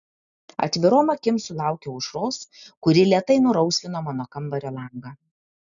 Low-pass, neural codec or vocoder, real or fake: 7.2 kHz; none; real